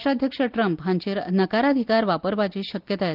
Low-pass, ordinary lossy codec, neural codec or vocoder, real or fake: 5.4 kHz; Opus, 32 kbps; none; real